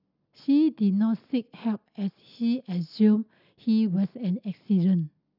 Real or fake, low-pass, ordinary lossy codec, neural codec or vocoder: real; 5.4 kHz; none; none